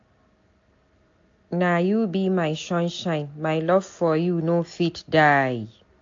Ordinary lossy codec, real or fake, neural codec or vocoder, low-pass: AAC, 48 kbps; real; none; 7.2 kHz